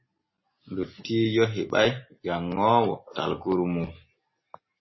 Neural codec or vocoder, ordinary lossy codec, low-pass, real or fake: none; MP3, 24 kbps; 7.2 kHz; real